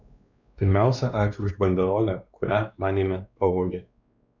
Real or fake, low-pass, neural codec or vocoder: fake; 7.2 kHz; codec, 16 kHz, 2 kbps, X-Codec, WavLM features, trained on Multilingual LibriSpeech